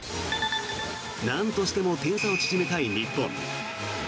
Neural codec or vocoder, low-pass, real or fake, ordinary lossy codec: none; none; real; none